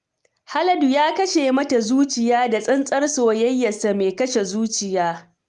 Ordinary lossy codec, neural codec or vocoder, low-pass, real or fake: Opus, 32 kbps; none; 10.8 kHz; real